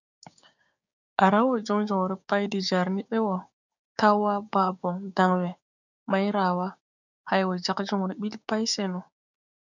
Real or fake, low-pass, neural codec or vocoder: fake; 7.2 kHz; codec, 16 kHz, 6 kbps, DAC